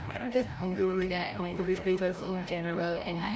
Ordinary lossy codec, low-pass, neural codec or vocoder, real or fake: none; none; codec, 16 kHz, 0.5 kbps, FreqCodec, larger model; fake